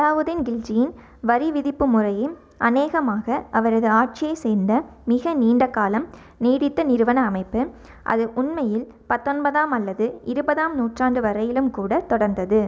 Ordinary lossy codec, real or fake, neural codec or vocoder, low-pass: none; real; none; none